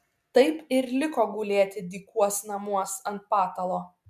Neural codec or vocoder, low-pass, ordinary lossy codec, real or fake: none; 14.4 kHz; MP3, 96 kbps; real